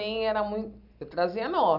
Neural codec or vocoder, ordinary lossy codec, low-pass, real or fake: none; none; 5.4 kHz; real